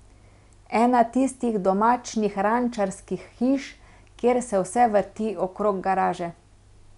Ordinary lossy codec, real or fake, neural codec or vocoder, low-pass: none; real; none; 10.8 kHz